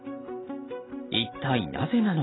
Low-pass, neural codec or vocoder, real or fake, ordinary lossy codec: 7.2 kHz; none; real; AAC, 16 kbps